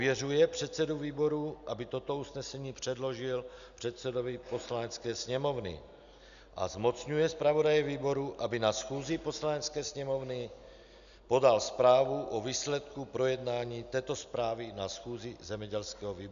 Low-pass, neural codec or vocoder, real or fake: 7.2 kHz; none; real